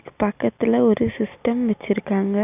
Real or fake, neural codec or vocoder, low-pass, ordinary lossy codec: real; none; 3.6 kHz; none